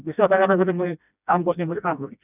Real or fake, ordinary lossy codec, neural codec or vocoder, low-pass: fake; none; codec, 16 kHz, 1 kbps, FreqCodec, smaller model; 3.6 kHz